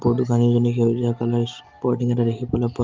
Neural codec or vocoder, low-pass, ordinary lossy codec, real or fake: none; 7.2 kHz; Opus, 24 kbps; real